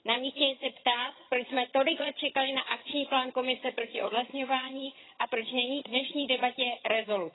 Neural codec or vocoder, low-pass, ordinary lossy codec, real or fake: vocoder, 22.05 kHz, 80 mel bands, HiFi-GAN; 7.2 kHz; AAC, 16 kbps; fake